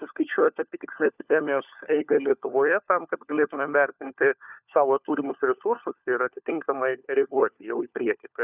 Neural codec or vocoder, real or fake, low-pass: codec, 16 kHz, 4 kbps, FunCodec, trained on LibriTTS, 50 frames a second; fake; 3.6 kHz